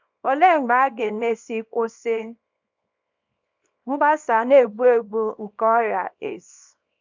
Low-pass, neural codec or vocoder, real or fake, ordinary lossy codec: 7.2 kHz; codec, 24 kHz, 0.9 kbps, WavTokenizer, small release; fake; MP3, 64 kbps